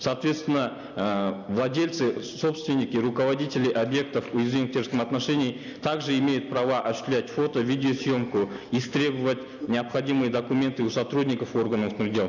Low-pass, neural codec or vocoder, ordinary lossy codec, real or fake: 7.2 kHz; none; none; real